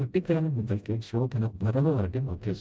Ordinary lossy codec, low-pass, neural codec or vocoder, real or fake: none; none; codec, 16 kHz, 0.5 kbps, FreqCodec, smaller model; fake